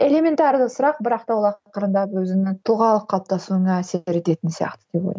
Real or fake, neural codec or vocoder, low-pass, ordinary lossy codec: real; none; none; none